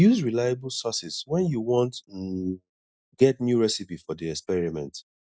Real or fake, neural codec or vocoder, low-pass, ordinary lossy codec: real; none; none; none